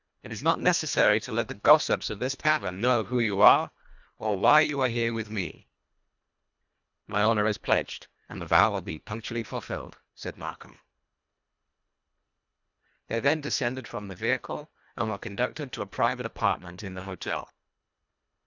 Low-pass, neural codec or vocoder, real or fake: 7.2 kHz; codec, 24 kHz, 1.5 kbps, HILCodec; fake